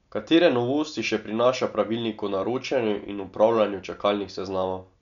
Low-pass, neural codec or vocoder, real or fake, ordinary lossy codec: 7.2 kHz; none; real; none